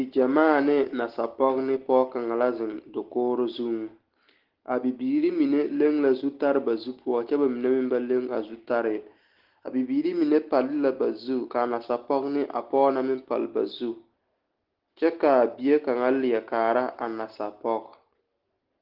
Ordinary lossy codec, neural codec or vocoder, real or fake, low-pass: Opus, 16 kbps; none; real; 5.4 kHz